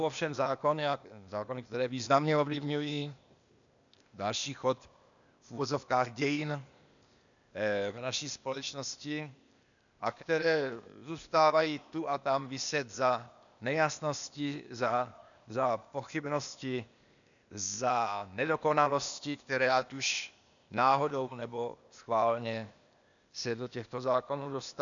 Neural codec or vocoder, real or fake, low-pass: codec, 16 kHz, 0.8 kbps, ZipCodec; fake; 7.2 kHz